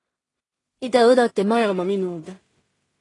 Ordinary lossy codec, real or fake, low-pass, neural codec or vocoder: AAC, 32 kbps; fake; 10.8 kHz; codec, 16 kHz in and 24 kHz out, 0.4 kbps, LongCat-Audio-Codec, two codebook decoder